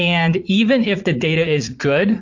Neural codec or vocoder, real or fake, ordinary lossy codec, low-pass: vocoder, 44.1 kHz, 80 mel bands, Vocos; fake; AAC, 48 kbps; 7.2 kHz